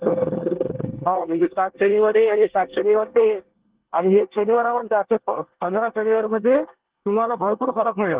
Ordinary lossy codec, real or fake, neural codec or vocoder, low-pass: Opus, 16 kbps; fake; codec, 24 kHz, 1 kbps, SNAC; 3.6 kHz